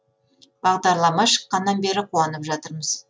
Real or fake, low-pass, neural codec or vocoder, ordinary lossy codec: real; none; none; none